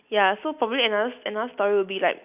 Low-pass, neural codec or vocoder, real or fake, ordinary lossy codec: 3.6 kHz; none; real; none